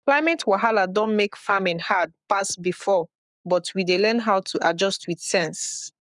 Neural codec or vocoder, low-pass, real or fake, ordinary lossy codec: codec, 44.1 kHz, 7.8 kbps, Pupu-Codec; 10.8 kHz; fake; none